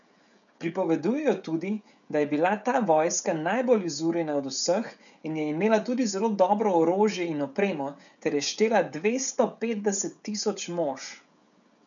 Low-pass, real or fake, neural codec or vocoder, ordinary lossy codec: 7.2 kHz; fake; codec, 16 kHz, 16 kbps, FreqCodec, smaller model; none